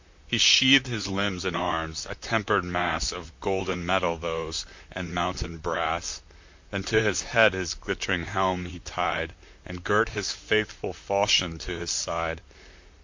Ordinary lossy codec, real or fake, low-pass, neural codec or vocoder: MP3, 48 kbps; fake; 7.2 kHz; vocoder, 44.1 kHz, 128 mel bands, Pupu-Vocoder